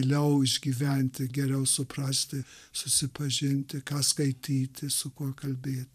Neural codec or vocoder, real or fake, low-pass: vocoder, 44.1 kHz, 128 mel bands every 512 samples, BigVGAN v2; fake; 14.4 kHz